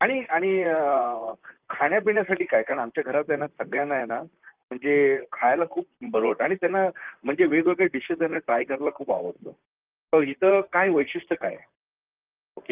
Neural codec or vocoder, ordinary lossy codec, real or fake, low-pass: vocoder, 44.1 kHz, 128 mel bands, Pupu-Vocoder; Opus, 24 kbps; fake; 3.6 kHz